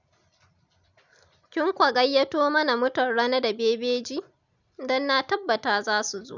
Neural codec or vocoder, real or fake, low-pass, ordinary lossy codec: none; real; 7.2 kHz; none